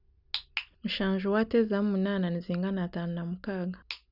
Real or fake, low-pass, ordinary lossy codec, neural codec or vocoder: real; 5.4 kHz; none; none